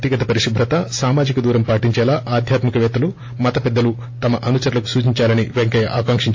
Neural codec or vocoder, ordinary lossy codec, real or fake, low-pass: none; AAC, 48 kbps; real; 7.2 kHz